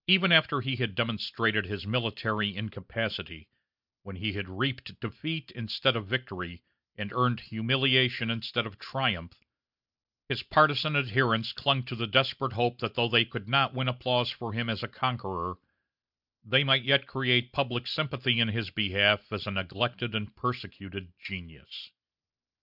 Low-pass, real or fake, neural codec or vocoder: 5.4 kHz; real; none